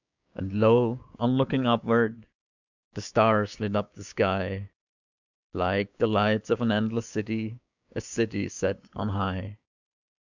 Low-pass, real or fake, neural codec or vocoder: 7.2 kHz; fake; codec, 16 kHz, 6 kbps, DAC